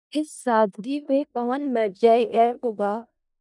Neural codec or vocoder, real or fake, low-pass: codec, 16 kHz in and 24 kHz out, 0.4 kbps, LongCat-Audio-Codec, four codebook decoder; fake; 10.8 kHz